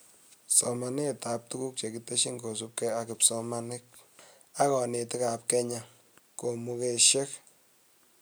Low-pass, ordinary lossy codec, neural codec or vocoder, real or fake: none; none; none; real